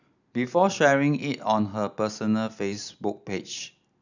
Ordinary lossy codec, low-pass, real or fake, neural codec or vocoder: none; 7.2 kHz; real; none